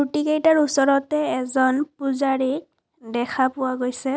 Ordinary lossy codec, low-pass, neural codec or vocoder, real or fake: none; none; none; real